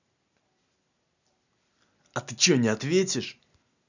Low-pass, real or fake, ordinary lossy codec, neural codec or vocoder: 7.2 kHz; real; none; none